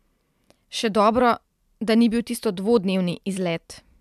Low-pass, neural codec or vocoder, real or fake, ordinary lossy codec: 14.4 kHz; none; real; MP3, 96 kbps